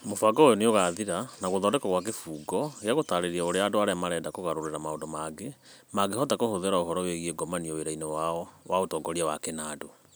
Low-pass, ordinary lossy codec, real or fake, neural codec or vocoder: none; none; real; none